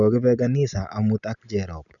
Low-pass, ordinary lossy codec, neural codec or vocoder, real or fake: 7.2 kHz; none; none; real